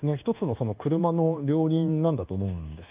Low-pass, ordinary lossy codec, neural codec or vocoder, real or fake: 3.6 kHz; Opus, 32 kbps; codec, 24 kHz, 1.2 kbps, DualCodec; fake